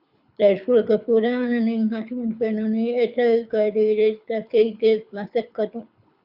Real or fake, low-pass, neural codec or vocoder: fake; 5.4 kHz; codec, 24 kHz, 6 kbps, HILCodec